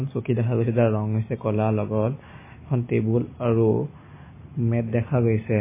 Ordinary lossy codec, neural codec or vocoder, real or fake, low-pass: MP3, 16 kbps; none; real; 3.6 kHz